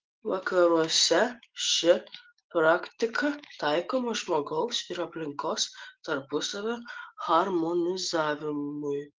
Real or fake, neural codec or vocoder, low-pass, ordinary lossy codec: fake; autoencoder, 48 kHz, 128 numbers a frame, DAC-VAE, trained on Japanese speech; 7.2 kHz; Opus, 16 kbps